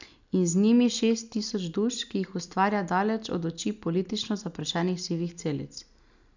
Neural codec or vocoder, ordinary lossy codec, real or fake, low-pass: none; none; real; 7.2 kHz